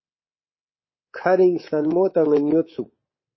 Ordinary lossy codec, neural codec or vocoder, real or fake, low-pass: MP3, 24 kbps; codec, 24 kHz, 3.1 kbps, DualCodec; fake; 7.2 kHz